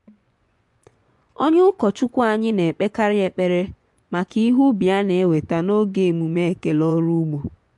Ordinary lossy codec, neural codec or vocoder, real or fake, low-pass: MP3, 64 kbps; vocoder, 24 kHz, 100 mel bands, Vocos; fake; 10.8 kHz